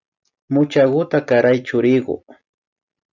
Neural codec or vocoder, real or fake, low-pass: none; real; 7.2 kHz